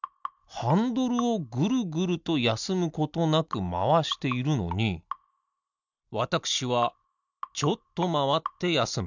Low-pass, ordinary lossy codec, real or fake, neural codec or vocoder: 7.2 kHz; none; real; none